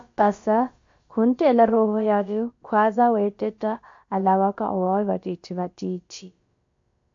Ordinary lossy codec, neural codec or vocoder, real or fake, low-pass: MP3, 48 kbps; codec, 16 kHz, about 1 kbps, DyCAST, with the encoder's durations; fake; 7.2 kHz